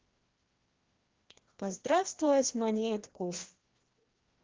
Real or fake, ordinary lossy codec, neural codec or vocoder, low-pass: fake; Opus, 16 kbps; codec, 16 kHz, 1 kbps, FreqCodec, larger model; 7.2 kHz